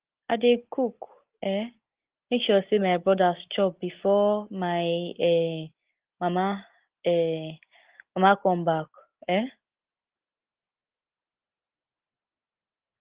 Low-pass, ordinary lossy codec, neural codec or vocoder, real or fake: 3.6 kHz; Opus, 16 kbps; none; real